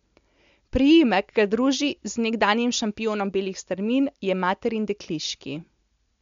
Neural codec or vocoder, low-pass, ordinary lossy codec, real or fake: none; 7.2 kHz; MP3, 64 kbps; real